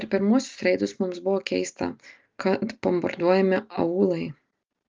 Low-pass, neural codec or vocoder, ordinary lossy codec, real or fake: 7.2 kHz; none; Opus, 24 kbps; real